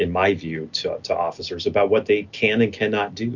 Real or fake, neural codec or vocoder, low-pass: real; none; 7.2 kHz